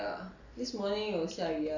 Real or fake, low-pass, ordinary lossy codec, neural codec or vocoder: real; 7.2 kHz; none; none